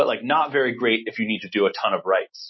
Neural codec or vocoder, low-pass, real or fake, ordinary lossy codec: none; 7.2 kHz; real; MP3, 24 kbps